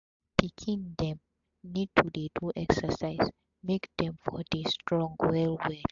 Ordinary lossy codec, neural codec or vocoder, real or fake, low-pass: AAC, 64 kbps; none; real; 7.2 kHz